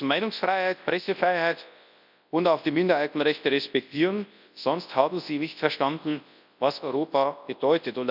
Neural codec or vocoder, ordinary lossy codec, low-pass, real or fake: codec, 24 kHz, 0.9 kbps, WavTokenizer, large speech release; none; 5.4 kHz; fake